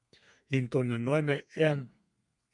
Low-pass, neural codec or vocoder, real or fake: 10.8 kHz; codec, 32 kHz, 1.9 kbps, SNAC; fake